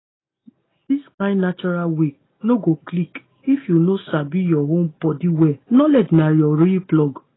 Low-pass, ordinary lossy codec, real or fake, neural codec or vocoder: 7.2 kHz; AAC, 16 kbps; real; none